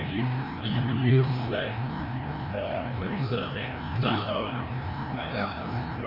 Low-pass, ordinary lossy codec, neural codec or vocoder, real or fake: 5.4 kHz; none; codec, 16 kHz, 1 kbps, FreqCodec, larger model; fake